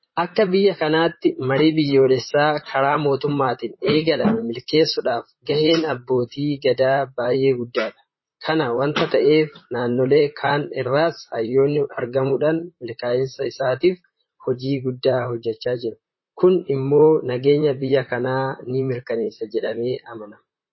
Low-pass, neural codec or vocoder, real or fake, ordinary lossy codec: 7.2 kHz; vocoder, 44.1 kHz, 128 mel bands, Pupu-Vocoder; fake; MP3, 24 kbps